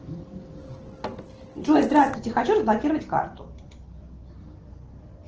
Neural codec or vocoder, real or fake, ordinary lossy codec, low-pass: none; real; Opus, 16 kbps; 7.2 kHz